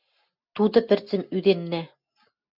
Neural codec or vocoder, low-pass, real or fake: none; 5.4 kHz; real